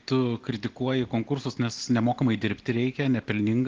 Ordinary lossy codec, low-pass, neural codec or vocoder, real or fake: Opus, 16 kbps; 7.2 kHz; none; real